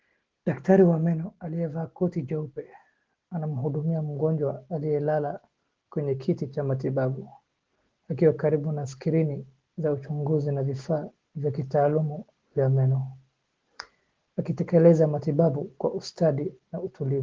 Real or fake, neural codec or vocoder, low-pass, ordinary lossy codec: real; none; 7.2 kHz; Opus, 16 kbps